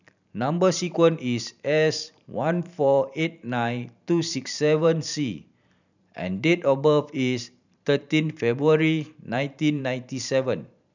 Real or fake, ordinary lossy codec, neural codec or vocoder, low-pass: fake; none; vocoder, 44.1 kHz, 128 mel bands every 512 samples, BigVGAN v2; 7.2 kHz